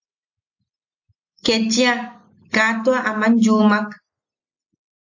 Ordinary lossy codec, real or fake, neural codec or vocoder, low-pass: AAC, 48 kbps; real; none; 7.2 kHz